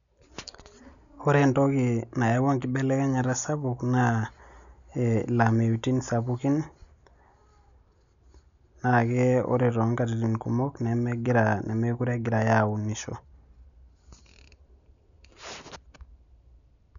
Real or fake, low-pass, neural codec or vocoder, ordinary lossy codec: real; 7.2 kHz; none; none